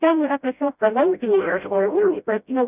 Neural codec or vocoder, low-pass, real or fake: codec, 16 kHz, 0.5 kbps, FreqCodec, smaller model; 3.6 kHz; fake